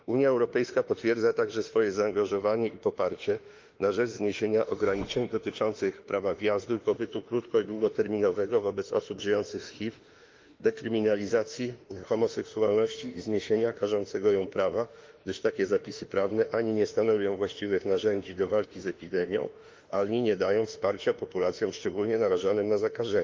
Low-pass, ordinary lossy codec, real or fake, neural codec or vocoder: 7.2 kHz; Opus, 32 kbps; fake; autoencoder, 48 kHz, 32 numbers a frame, DAC-VAE, trained on Japanese speech